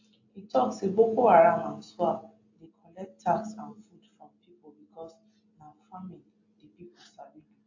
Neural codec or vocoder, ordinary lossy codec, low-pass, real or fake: none; AAC, 48 kbps; 7.2 kHz; real